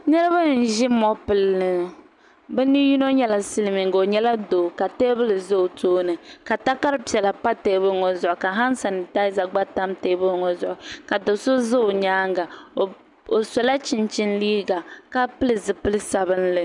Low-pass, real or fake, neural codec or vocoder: 10.8 kHz; real; none